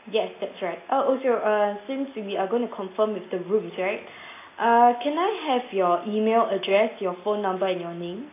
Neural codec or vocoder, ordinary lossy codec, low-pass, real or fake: none; AAC, 24 kbps; 3.6 kHz; real